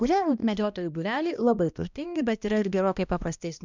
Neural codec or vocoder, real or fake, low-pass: codec, 16 kHz, 1 kbps, X-Codec, HuBERT features, trained on balanced general audio; fake; 7.2 kHz